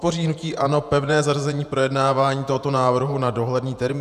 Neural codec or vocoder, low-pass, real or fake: vocoder, 48 kHz, 128 mel bands, Vocos; 14.4 kHz; fake